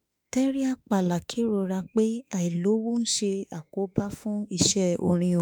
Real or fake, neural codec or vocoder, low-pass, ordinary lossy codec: fake; autoencoder, 48 kHz, 32 numbers a frame, DAC-VAE, trained on Japanese speech; none; none